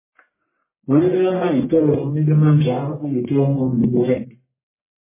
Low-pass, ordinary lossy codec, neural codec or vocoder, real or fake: 3.6 kHz; MP3, 16 kbps; codec, 44.1 kHz, 1.7 kbps, Pupu-Codec; fake